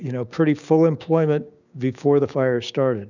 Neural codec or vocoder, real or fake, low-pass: codec, 16 kHz, 6 kbps, DAC; fake; 7.2 kHz